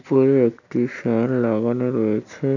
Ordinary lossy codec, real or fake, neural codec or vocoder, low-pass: none; real; none; 7.2 kHz